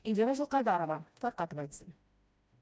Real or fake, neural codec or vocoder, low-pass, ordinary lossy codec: fake; codec, 16 kHz, 1 kbps, FreqCodec, smaller model; none; none